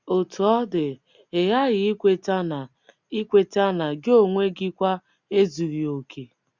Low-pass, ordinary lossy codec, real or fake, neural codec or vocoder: 7.2 kHz; Opus, 64 kbps; real; none